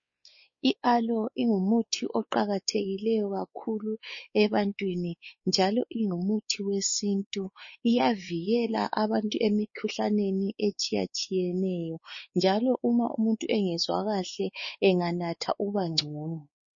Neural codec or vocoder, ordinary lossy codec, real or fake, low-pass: codec, 16 kHz, 4 kbps, X-Codec, WavLM features, trained on Multilingual LibriSpeech; MP3, 32 kbps; fake; 7.2 kHz